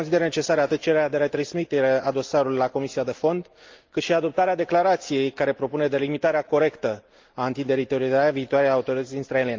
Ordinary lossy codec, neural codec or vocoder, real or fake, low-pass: Opus, 32 kbps; none; real; 7.2 kHz